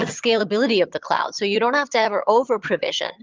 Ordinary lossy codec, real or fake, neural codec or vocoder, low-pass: Opus, 24 kbps; fake; vocoder, 22.05 kHz, 80 mel bands, HiFi-GAN; 7.2 kHz